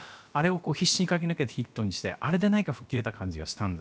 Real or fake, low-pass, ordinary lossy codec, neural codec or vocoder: fake; none; none; codec, 16 kHz, about 1 kbps, DyCAST, with the encoder's durations